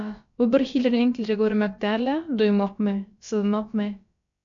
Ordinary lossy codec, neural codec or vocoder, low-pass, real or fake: MP3, 64 kbps; codec, 16 kHz, about 1 kbps, DyCAST, with the encoder's durations; 7.2 kHz; fake